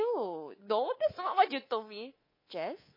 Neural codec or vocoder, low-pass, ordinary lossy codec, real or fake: codec, 24 kHz, 3.1 kbps, DualCodec; 5.4 kHz; MP3, 24 kbps; fake